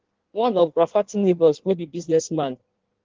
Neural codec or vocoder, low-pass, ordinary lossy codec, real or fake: codec, 16 kHz in and 24 kHz out, 1.1 kbps, FireRedTTS-2 codec; 7.2 kHz; Opus, 16 kbps; fake